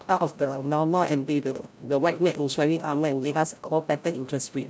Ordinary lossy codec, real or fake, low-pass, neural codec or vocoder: none; fake; none; codec, 16 kHz, 0.5 kbps, FreqCodec, larger model